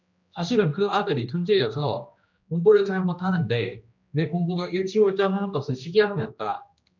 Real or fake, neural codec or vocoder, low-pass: fake; codec, 16 kHz, 1 kbps, X-Codec, HuBERT features, trained on general audio; 7.2 kHz